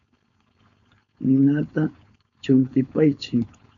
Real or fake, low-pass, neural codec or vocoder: fake; 7.2 kHz; codec, 16 kHz, 4.8 kbps, FACodec